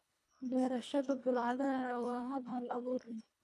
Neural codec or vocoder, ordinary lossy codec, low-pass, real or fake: codec, 24 kHz, 1.5 kbps, HILCodec; none; none; fake